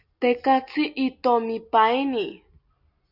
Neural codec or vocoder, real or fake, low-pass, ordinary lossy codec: none; real; 5.4 kHz; Opus, 64 kbps